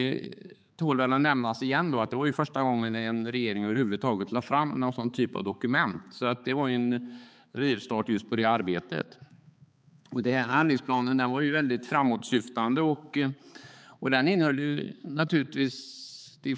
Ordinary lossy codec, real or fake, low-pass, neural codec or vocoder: none; fake; none; codec, 16 kHz, 4 kbps, X-Codec, HuBERT features, trained on balanced general audio